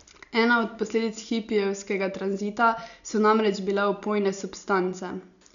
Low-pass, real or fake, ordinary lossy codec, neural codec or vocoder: 7.2 kHz; real; none; none